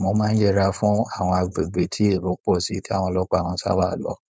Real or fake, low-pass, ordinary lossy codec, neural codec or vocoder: fake; none; none; codec, 16 kHz, 4.8 kbps, FACodec